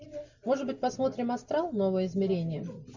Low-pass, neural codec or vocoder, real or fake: 7.2 kHz; none; real